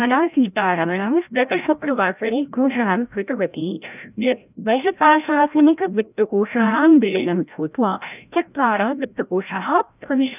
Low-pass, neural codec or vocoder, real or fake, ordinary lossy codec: 3.6 kHz; codec, 16 kHz, 0.5 kbps, FreqCodec, larger model; fake; none